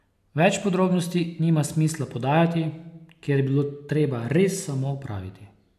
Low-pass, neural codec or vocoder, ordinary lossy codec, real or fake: 14.4 kHz; none; none; real